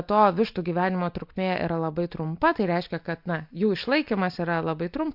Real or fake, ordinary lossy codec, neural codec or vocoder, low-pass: real; MP3, 48 kbps; none; 5.4 kHz